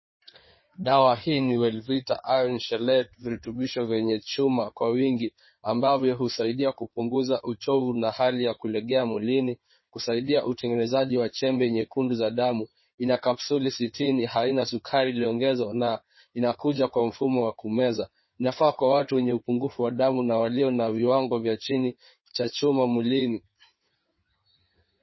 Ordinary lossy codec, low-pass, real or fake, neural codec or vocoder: MP3, 24 kbps; 7.2 kHz; fake; codec, 16 kHz in and 24 kHz out, 2.2 kbps, FireRedTTS-2 codec